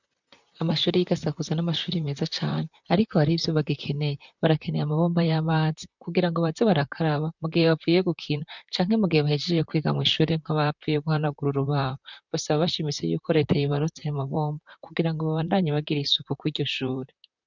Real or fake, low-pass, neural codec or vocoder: fake; 7.2 kHz; vocoder, 44.1 kHz, 128 mel bands, Pupu-Vocoder